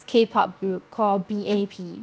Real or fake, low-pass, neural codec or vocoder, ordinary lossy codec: fake; none; codec, 16 kHz, 0.7 kbps, FocalCodec; none